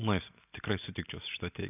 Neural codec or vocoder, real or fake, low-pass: none; real; 3.6 kHz